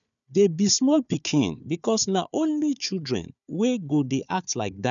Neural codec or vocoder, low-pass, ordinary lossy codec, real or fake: codec, 16 kHz, 4 kbps, FunCodec, trained on Chinese and English, 50 frames a second; 7.2 kHz; none; fake